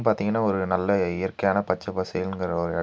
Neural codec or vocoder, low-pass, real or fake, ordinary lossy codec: none; none; real; none